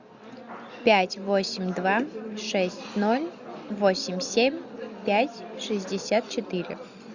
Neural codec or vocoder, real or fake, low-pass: none; real; 7.2 kHz